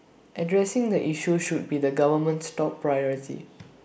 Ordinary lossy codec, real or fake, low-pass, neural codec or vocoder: none; real; none; none